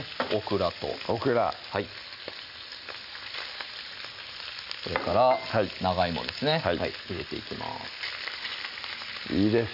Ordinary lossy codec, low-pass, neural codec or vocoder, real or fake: none; 5.4 kHz; none; real